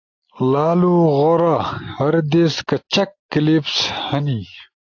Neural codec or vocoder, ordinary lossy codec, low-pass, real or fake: none; AAC, 48 kbps; 7.2 kHz; real